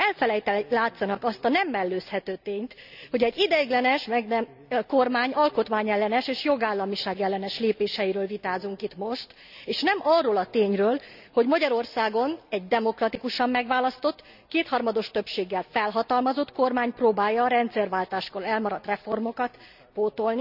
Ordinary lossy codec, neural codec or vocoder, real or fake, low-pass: none; none; real; 5.4 kHz